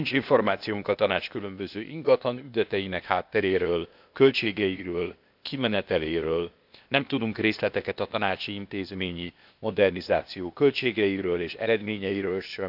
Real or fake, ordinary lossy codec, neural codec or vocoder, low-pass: fake; none; codec, 16 kHz, 0.8 kbps, ZipCodec; 5.4 kHz